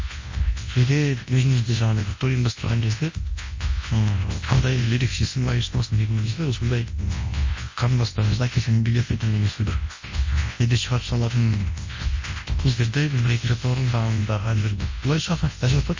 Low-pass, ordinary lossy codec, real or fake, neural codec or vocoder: 7.2 kHz; MP3, 32 kbps; fake; codec, 24 kHz, 0.9 kbps, WavTokenizer, large speech release